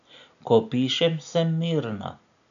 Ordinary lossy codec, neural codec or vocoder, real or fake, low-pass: none; none; real; 7.2 kHz